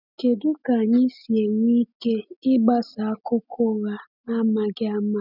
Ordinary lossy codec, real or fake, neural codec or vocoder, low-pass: none; real; none; 5.4 kHz